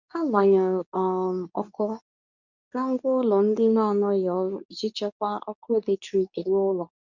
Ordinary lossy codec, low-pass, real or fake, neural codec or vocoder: none; 7.2 kHz; fake; codec, 24 kHz, 0.9 kbps, WavTokenizer, medium speech release version 2